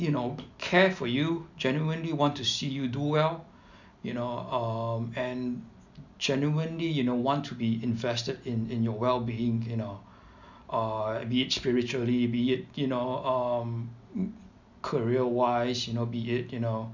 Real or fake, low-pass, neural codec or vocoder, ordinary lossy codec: real; 7.2 kHz; none; none